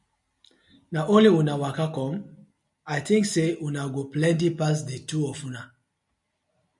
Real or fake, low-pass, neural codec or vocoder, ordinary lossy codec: real; 10.8 kHz; none; MP3, 96 kbps